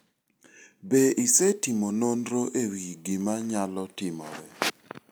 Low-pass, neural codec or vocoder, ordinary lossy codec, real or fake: none; none; none; real